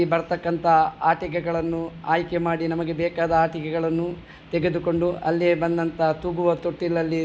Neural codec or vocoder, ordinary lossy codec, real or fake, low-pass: none; none; real; none